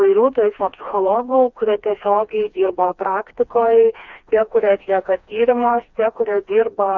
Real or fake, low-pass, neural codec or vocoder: fake; 7.2 kHz; codec, 16 kHz, 2 kbps, FreqCodec, smaller model